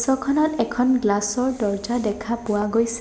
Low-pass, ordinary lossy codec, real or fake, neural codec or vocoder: none; none; real; none